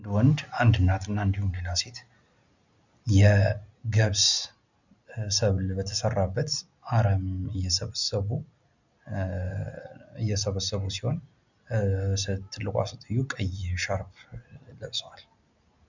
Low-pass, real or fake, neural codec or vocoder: 7.2 kHz; real; none